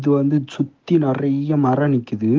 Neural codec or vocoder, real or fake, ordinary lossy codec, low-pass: none; real; Opus, 16 kbps; 7.2 kHz